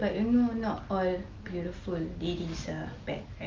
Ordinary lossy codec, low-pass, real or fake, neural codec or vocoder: Opus, 24 kbps; 7.2 kHz; real; none